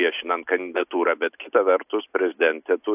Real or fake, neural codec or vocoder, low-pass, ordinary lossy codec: real; none; 3.6 kHz; AAC, 32 kbps